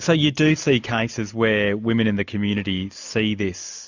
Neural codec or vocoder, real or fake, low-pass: none; real; 7.2 kHz